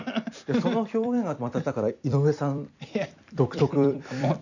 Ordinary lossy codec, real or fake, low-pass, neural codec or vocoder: none; fake; 7.2 kHz; vocoder, 44.1 kHz, 128 mel bands every 256 samples, BigVGAN v2